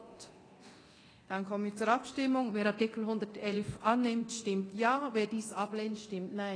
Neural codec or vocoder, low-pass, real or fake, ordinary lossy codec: codec, 24 kHz, 0.9 kbps, DualCodec; 9.9 kHz; fake; AAC, 32 kbps